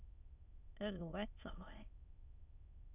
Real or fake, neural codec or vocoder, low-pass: fake; autoencoder, 22.05 kHz, a latent of 192 numbers a frame, VITS, trained on many speakers; 3.6 kHz